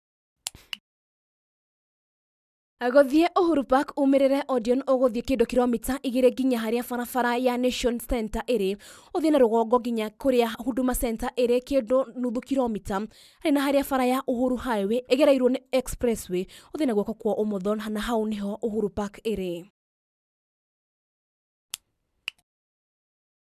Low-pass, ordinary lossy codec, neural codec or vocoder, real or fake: 14.4 kHz; none; none; real